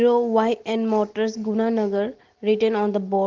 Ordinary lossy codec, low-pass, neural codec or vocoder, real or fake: Opus, 16 kbps; 7.2 kHz; none; real